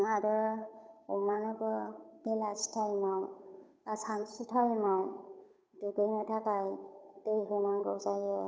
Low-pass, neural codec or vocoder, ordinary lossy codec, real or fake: none; codec, 16 kHz, 8 kbps, FunCodec, trained on Chinese and English, 25 frames a second; none; fake